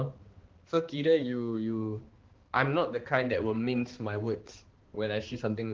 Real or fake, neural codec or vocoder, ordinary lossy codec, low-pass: fake; codec, 16 kHz, 2 kbps, X-Codec, HuBERT features, trained on balanced general audio; Opus, 16 kbps; 7.2 kHz